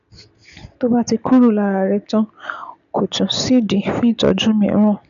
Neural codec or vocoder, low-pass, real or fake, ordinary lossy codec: none; 7.2 kHz; real; none